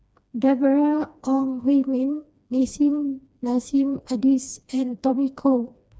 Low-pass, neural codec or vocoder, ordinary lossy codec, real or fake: none; codec, 16 kHz, 2 kbps, FreqCodec, smaller model; none; fake